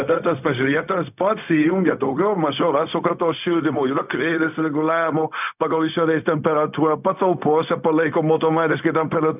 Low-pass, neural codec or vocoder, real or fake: 3.6 kHz; codec, 16 kHz, 0.4 kbps, LongCat-Audio-Codec; fake